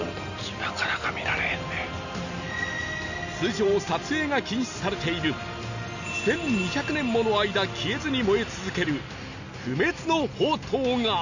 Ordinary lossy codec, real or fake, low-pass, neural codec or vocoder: none; real; 7.2 kHz; none